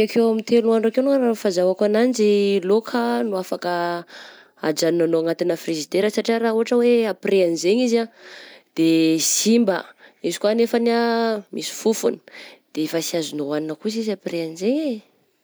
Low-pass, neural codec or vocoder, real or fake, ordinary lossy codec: none; none; real; none